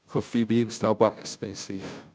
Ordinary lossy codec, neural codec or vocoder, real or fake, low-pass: none; codec, 16 kHz, 0.5 kbps, FunCodec, trained on Chinese and English, 25 frames a second; fake; none